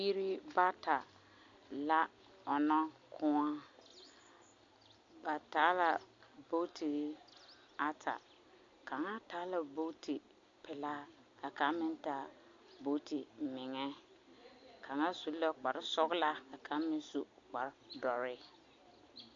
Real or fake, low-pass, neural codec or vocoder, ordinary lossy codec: real; 7.2 kHz; none; AAC, 48 kbps